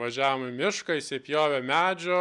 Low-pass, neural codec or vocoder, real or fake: 10.8 kHz; none; real